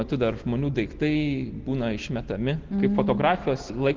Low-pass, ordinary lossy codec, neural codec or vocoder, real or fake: 7.2 kHz; Opus, 16 kbps; none; real